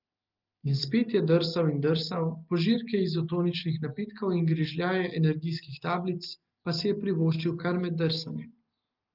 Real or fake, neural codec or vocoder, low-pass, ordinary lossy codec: real; none; 5.4 kHz; Opus, 32 kbps